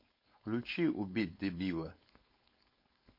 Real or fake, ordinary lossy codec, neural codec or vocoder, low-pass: fake; MP3, 32 kbps; codec, 16 kHz, 4.8 kbps, FACodec; 5.4 kHz